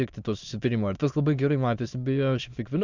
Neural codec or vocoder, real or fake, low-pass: autoencoder, 22.05 kHz, a latent of 192 numbers a frame, VITS, trained on many speakers; fake; 7.2 kHz